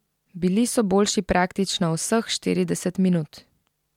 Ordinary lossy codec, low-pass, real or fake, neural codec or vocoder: MP3, 96 kbps; 19.8 kHz; real; none